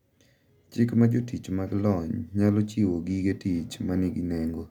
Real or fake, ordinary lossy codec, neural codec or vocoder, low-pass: real; none; none; 19.8 kHz